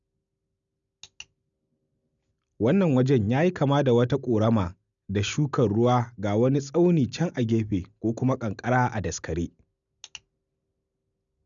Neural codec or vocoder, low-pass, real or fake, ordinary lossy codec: none; 7.2 kHz; real; none